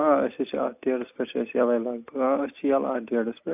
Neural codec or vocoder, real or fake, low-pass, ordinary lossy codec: none; real; 3.6 kHz; AAC, 32 kbps